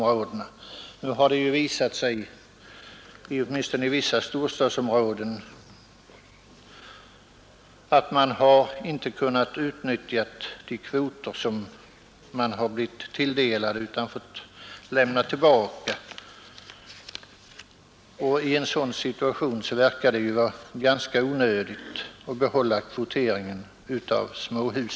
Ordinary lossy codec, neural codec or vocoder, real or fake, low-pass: none; none; real; none